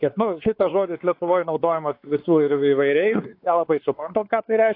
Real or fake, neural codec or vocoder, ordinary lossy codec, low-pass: fake; codec, 16 kHz, 4 kbps, X-Codec, WavLM features, trained on Multilingual LibriSpeech; AAC, 32 kbps; 5.4 kHz